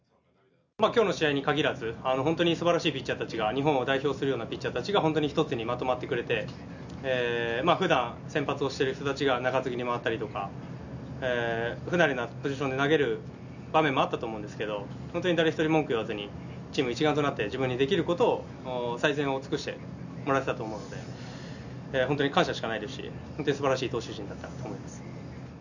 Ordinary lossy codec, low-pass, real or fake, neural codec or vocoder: none; 7.2 kHz; real; none